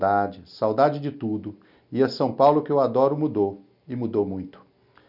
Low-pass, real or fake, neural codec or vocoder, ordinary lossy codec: 5.4 kHz; real; none; none